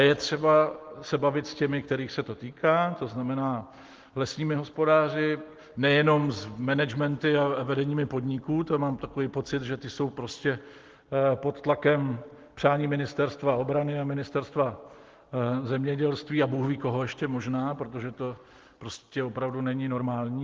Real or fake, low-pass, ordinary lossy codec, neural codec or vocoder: real; 7.2 kHz; Opus, 16 kbps; none